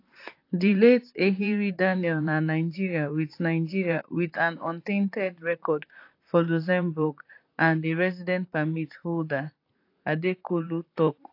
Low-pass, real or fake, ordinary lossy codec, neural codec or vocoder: 5.4 kHz; fake; AAC, 32 kbps; vocoder, 44.1 kHz, 128 mel bands, Pupu-Vocoder